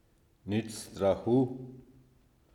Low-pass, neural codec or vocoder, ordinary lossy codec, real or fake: 19.8 kHz; none; none; real